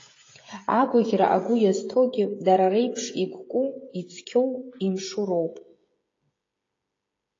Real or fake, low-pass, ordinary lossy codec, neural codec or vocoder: fake; 7.2 kHz; AAC, 32 kbps; codec, 16 kHz, 16 kbps, FreqCodec, smaller model